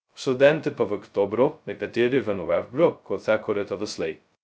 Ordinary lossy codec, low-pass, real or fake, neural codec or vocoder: none; none; fake; codec, 16 kHz, 0.2 kbps, FocalCodec